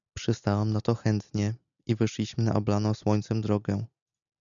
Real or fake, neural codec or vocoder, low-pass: real; none; 7.2 kHz